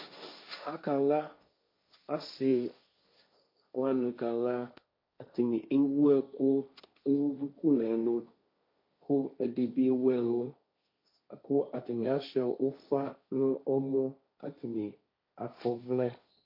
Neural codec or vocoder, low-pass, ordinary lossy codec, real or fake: codec, 16 kHz, 1.1 kbps, Voila-Tokenizer; 5.4 kHz; MP3, 32 kbps; fake